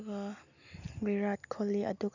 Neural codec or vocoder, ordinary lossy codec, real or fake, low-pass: none; none; real; 7.2 kHz